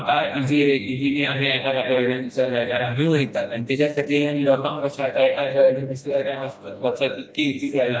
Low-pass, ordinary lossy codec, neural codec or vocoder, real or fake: none; none; codec, 16 kHz, 1 kbps, FreqCodec, smaller model; fake